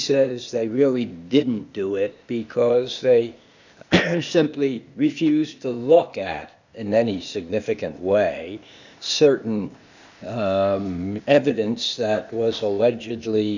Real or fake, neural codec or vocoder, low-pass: fake; codec, 16 kHz, 0.8 kbps, ZipCodec; 7.2 kHz